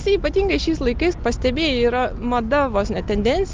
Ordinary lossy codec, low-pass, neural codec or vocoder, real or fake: Opus, 24 kbps; 7.2 kHz; none; real